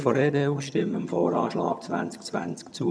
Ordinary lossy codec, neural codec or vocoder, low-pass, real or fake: none; vocoder, 22.05 kHz, 80 mel bands, HiFi-GAN; none; fake